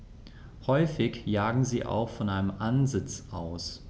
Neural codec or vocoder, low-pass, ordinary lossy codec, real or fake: none; none; none; real